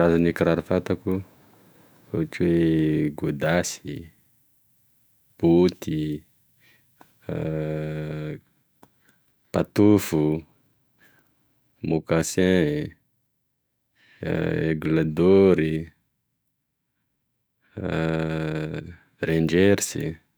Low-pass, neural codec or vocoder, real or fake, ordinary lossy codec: none; autoencoder, 48 kHz, 128 numbers a frame, DAC-VAE, trained on Japanese speech; fake; none